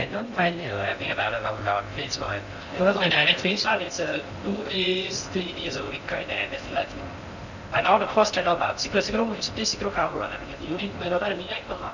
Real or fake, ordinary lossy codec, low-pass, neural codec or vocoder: fake; none; 7.2 kHz; codec, 16 kHz in and 24 kHz out, 0.6 kbps, FocalCodec, streaming, 4096 codes